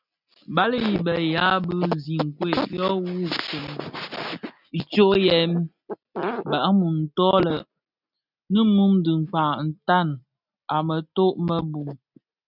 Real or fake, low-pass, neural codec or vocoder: real; 5.4 kHz; none